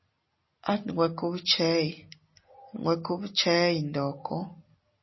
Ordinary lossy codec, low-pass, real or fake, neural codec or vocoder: MP3, 24 kbps; 7.2 kHz; real; none